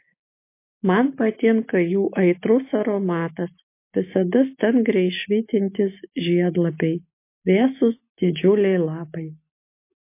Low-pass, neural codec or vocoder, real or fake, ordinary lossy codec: 3.6 kHz; none; real; MP3, 24 kbps